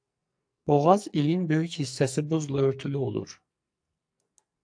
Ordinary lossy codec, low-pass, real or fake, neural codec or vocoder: AAC, 64 kbps; 9.9 kHz; fake; codec, 44.1 kHz, 2.6 kbps, SNAC